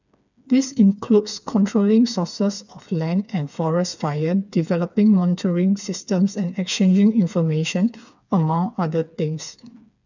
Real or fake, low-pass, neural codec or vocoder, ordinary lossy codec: fake; 7.2 kHz; codec, 16 kHz, 4 kbps, FreqCodec, smaller model; none